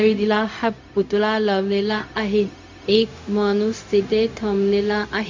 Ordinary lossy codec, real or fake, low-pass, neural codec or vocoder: MP3, 64 kbps; fake; 7.2 kHz; codec, 16 kHz, 0.4 kbps, LongCat-Audio-Codec